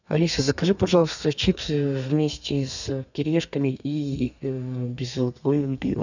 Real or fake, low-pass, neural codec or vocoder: fake; 7.2 kHz; codec, 32 kHz, 1.9 kbps, SNAC